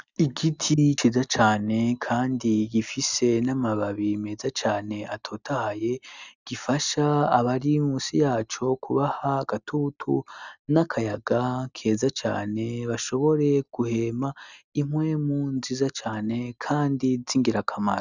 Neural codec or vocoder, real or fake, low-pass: none; real; 7.2 kHz